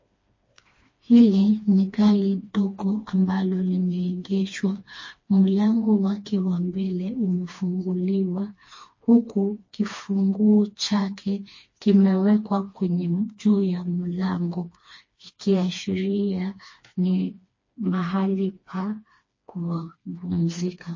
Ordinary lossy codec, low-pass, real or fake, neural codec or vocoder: MP3, 32 kbps; 7.2 kHz; fake; codec, 16 kHz, 2 kbps, FreqCodec, smaller model